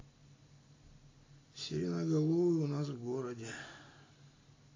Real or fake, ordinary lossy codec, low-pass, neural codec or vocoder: real; AAC, 32 kbps; 7.2 kHz; none